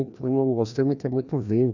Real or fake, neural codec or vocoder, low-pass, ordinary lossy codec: fake; codec, 16 kHz, 1 kbps, FreqCodec, larger model; 7.2 kHz; none